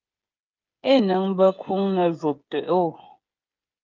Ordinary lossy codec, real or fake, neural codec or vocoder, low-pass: Opus, 24 kbps; fake; codec, 16 kHz, 8 kbps, FreqCodec, smaller model; 7.2 kHz